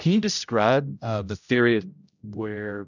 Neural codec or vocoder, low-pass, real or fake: codec, 16 kHz, 0.5 kbps, X-Codec, HuBERT features, trained on general audio; 7.2 kHz; fake